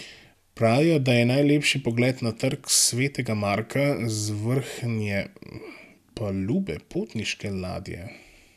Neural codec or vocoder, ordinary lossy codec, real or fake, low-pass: none; none; real; 14.4 kHz